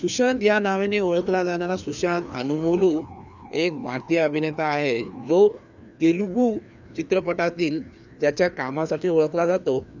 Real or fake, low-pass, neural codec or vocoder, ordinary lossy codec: fake; 7.2 kHz; codec, 16 kHz, 2 kbps, FreqCodec, larger model; none